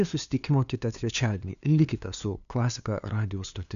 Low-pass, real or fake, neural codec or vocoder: 7.2 kHz; fake; codec, 16 kHz, 2 kbps, FunCodec, trained on LibriTTS, 25 frames a second